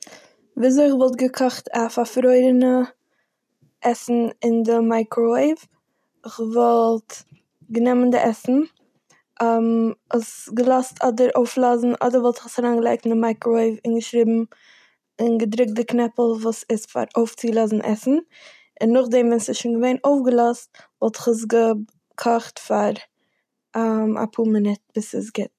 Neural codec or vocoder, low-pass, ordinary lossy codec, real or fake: none; 14.4 kHz; none; real